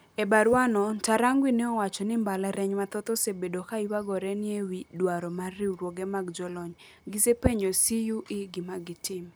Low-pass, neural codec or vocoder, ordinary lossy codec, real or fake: none; none; none; real